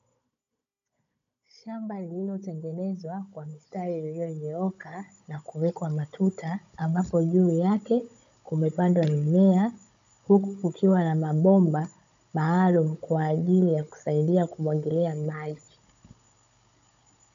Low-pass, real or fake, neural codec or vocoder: 7.2 kHz; fake; codec, 16 kHz, 16 kbps, FunCodec, trained on Chinese and English, 50 frames a second